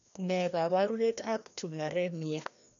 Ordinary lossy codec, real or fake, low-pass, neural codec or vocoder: none; fake; 7.2 kHz; codec, 16 kHz, 1 kbps, FreqCodec, larger model